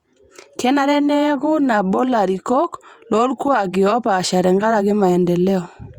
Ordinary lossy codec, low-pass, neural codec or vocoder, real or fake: Opus, 64 kbps; 19.8 kHz; vocoder, 48 kHz, 128 mel bands, Vocos; fake